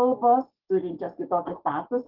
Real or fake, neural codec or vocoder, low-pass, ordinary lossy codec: fake; codec, 44.1 kHz, 7.8 kbps, DAC; 5.4 kHz; Opus, 16 kbps